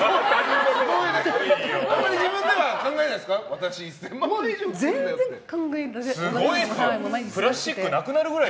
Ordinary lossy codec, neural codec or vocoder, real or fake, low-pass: none; none; real; none